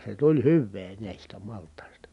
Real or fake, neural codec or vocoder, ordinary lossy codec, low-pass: real; none; none; 10.8 kHz